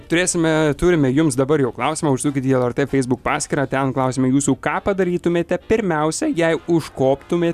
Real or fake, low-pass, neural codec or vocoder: real; 14.4 kHz; none